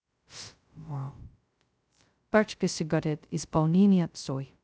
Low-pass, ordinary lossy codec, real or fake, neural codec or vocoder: none; none; fake; codec, 16 kHz, 0.2 kbps, FocalCodec